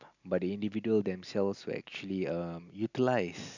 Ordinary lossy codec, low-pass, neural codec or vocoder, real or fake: none; 7.2 kHz; none; real